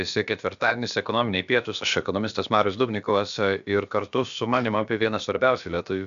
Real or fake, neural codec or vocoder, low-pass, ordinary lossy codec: fake; codec, 16 kHz, about 1 kbps, DyCAST, with the encoder's durations; 7.2 kHz; AAC, 96 kbps